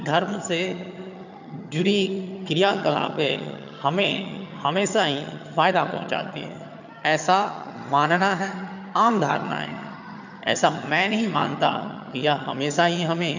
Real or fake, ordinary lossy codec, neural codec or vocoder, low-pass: fake; none; vocoder, 22.05 kHz, 80 mel bands, HiFi-GAN; 7.2 kHz